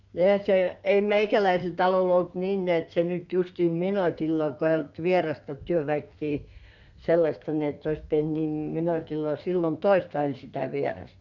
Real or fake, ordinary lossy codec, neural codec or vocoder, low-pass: fake; none; codec, 32 kHz, 1.9 kbps, SNAC; 7.2 kHz